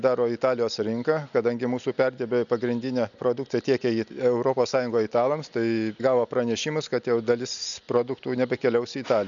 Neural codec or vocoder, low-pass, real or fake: none; 7.2 kHz; real